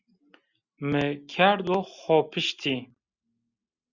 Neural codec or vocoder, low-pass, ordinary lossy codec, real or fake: none; 7.2 kHz; Opus, 64 kbps; real